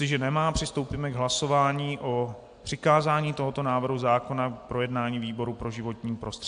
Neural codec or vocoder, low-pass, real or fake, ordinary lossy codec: none; 9.9 kHz; real; AAC, 64 kbps